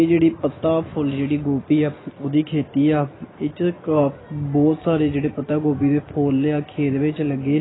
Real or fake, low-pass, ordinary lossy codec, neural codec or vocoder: real; 7.2 kHz; AAC, 16 kbps; none